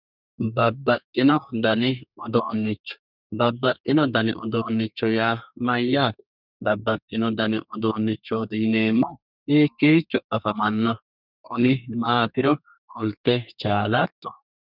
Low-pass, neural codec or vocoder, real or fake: 5.4 kHz; codec, 32 kHz, 1.9 kbps, SNAC; fake